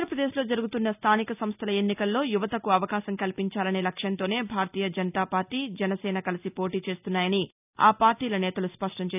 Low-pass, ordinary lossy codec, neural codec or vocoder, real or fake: 3.6 kHz; none; none; real